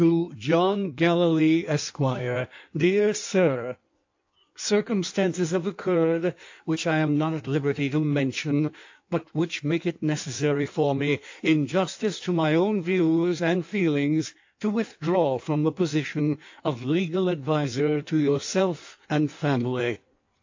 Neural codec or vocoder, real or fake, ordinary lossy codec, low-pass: codec, 16 kHz in and 24 kHz out, 1.1 kbps, FireRedTTS-2 codec; fake; AAC, 48 kbps; 7.2 kHz